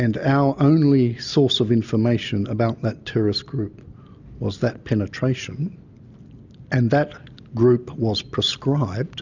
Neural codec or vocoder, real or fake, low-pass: none; real; 7.2 kHz